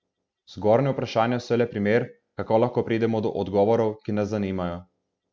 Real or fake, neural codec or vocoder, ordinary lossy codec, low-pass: real; none; none; none